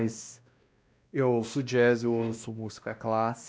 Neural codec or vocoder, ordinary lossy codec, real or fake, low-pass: codec, 16 kHz, 1 kbps, X-Codec, WavLM features, trained on Multilingual LibriSpeech; none; fake; none